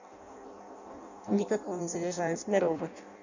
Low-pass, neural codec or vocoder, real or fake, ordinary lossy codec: 7.2 kHz; codec, 16 kHz in and 24 kHz out, 0.6 kbps, FireRedTTS-2 codec; fake; none